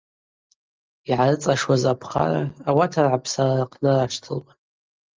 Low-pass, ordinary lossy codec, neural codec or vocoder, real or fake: 7.2 kHz; Opus, 24 kbps; none; real